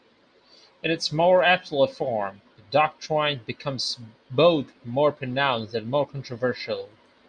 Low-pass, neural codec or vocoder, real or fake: 9.9 kHz; none; real